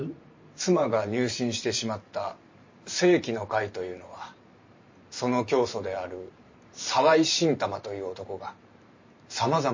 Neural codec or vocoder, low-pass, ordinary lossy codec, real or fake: vocoder, 44.1 kHz, 128 mel bands every 512 samples, BigVGAN v2; 7.2 kHz; MP3, 32 kbps; fake